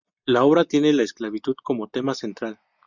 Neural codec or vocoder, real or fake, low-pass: none; real; 7.2 kHz